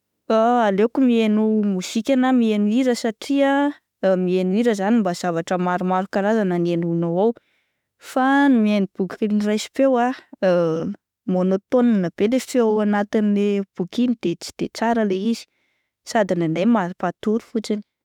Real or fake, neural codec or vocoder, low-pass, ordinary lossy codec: fake; autoencoder, 48 kHz, 32 numbers a frame, DAC-VAE, trained on Japanese speech; 19.8 kHz; none